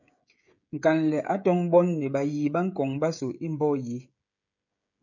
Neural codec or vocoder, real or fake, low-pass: codec, 16 kHz, 16 kbps, FreqCodec, smaller model; fake; 7.2 kHz